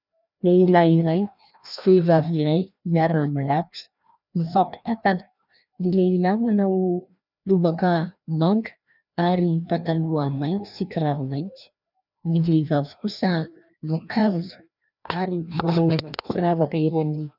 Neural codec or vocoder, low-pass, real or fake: codec, 16 kHz, 1 kbps, FreqCodec, larger model; 5.4 kHz; fake